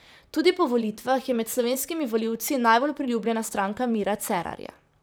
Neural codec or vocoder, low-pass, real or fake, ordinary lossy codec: vocoder, 44.1 kHz, 128 mel bands, Pupu-Vocoder; none; fake; none